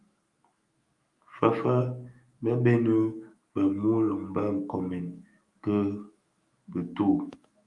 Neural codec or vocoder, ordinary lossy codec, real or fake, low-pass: autoencoder, 48 kHz, 128 numbers a frame, DAC-VAE, trained on Japanese speech; Opus, 32 kbps; fake; 10.8 kHz